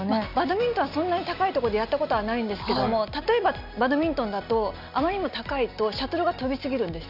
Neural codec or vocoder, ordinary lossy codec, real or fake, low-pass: none; none; real; 5.4 kHz